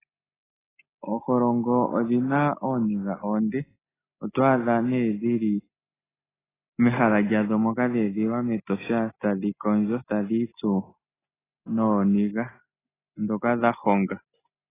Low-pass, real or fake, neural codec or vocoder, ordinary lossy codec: 3.6 kHz; real; none; AAC, 16 kbps